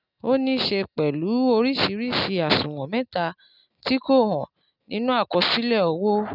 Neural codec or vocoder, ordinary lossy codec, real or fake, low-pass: none; none; real; 5.4 kHz